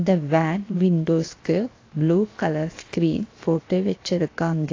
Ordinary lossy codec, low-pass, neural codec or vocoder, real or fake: AAC, 32 kbps; 7.2 kHz; codec, 16 kHz, 0.7 kbps, FocalCodec; fake